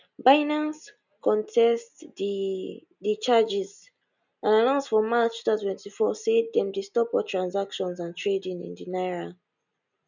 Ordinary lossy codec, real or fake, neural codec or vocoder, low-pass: none; real; none; 7.2 kHz